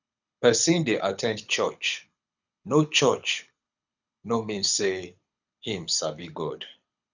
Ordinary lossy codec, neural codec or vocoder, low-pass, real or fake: none; codec, 24 kHz, 6 kbps, HILCodec; 7.2 kHz; fake